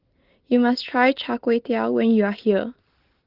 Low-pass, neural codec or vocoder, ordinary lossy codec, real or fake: 5.4 kHz; none; Opus, 16 kbps; real